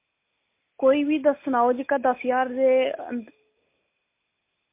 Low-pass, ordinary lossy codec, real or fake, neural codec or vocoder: 3.6 kHz; MP3, 24 kbps; real; none